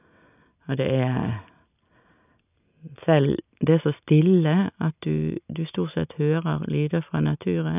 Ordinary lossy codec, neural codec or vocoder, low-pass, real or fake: none; none; 3.6 kHz; real